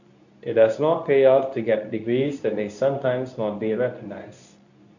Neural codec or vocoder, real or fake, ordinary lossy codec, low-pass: codec, 24 kHz, 0.9 kbps, WavTokenizer, medium speech release version 2; fake; none; 7.2 kHz